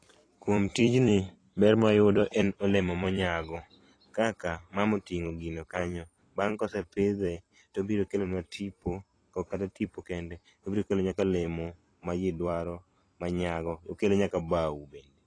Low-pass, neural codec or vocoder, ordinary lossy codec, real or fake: 9.9 kHz; vocoder, 44.1 kHz, 128 mel bands every 256 samples, BigVGAN v2; AAC, 32 kbps; fake